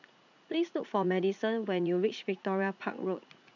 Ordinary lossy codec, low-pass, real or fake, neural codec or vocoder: none; 7.2 kHz; fake; vocoder, 44.1 kHz, 80 mel bands, Vocos